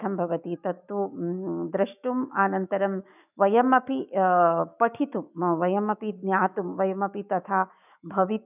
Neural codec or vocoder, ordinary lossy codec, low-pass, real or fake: autoencoder, 48 kHz, 128 numbers a frame, DAC-VAE, trained on Japanese speech; none; 3.6 kHz; fake